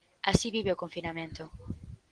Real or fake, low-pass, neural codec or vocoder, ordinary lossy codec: real; 9.9 kHz; none; Opus, 16 kbps